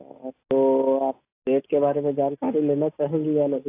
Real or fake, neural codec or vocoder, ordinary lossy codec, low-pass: real; none; AAC, 24 kbps; 3.6 kHz